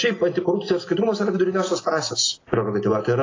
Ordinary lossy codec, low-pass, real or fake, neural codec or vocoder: AAC, 32 kbps; 7.2 kHz; real; none